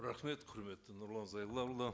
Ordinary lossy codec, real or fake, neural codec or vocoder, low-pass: none; real; none; none